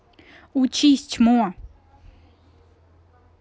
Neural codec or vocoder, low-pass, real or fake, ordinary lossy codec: none; none; real; none